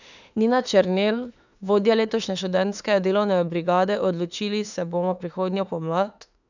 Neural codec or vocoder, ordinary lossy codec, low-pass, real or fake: autoencoder, 48 kHz, 32 numbers a frame, DAC-VAE, trained on Japanese speech; none; 7.2 kHz; fake